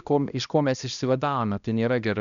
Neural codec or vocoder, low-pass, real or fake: codec, 16 kHz, 1 kbps, X-Codec, HuBERT features, trained on balanced general audio; 7.2 kHz; fake